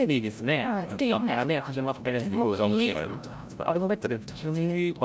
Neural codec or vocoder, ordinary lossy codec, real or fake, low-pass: codec, 16 kHz, 0.5 kbps, FreqCodec, larger model; none; fake; none